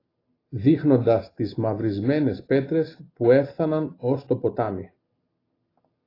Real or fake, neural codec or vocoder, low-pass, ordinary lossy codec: real; none; 5.4 kHz; AAC, 24 kbps